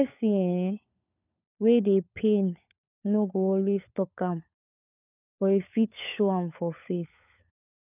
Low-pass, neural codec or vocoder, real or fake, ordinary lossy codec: 3.6 kHz; codec, 16 kHz, 4 kbps, FunCodec, trained on LibriTTS, 50 frames a second; fake; none